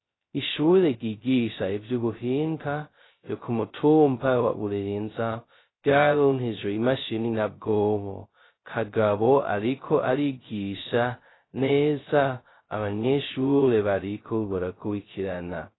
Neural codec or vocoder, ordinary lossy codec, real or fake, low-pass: codec, 16 kHz, 0.2 kbps, FocalCodec; AAC, 16 kbps; fake; 7.2 kHz